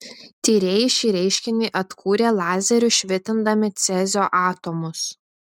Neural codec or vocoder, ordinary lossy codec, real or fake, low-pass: none; MP3, 96 kbps; real; 19.8 kHz